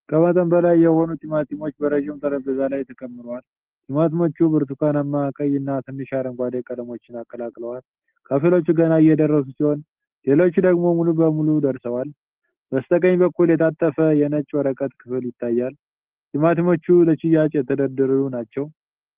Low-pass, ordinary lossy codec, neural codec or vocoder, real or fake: 3.6 kHz; Opus, 16 kbps; none; real